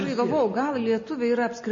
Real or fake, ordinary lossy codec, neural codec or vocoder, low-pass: real; MP3, 32 kbps; none; 7.2 kHz